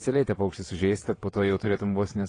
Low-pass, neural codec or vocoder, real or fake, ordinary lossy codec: 9.9 kHz; vocoder, 22.05 kHz, 80 mel bands, WaveNeXt; fake; AAC, 32 kbps